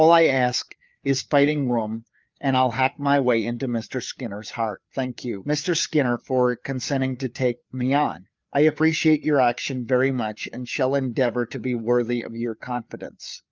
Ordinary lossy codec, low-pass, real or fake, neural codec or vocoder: Opus, 24 kbps; 7.2 kHz; fake; codec, 16 kHz, 4 kbps, FreqCodec, larger model